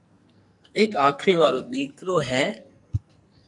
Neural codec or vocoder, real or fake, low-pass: codec, 44.1 kHz, 2.6 kbps, SNAC; fake; 10.8 kHz